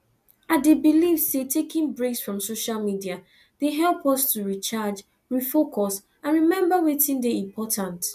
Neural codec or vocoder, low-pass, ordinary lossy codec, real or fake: none; 14.4 kHz; none; real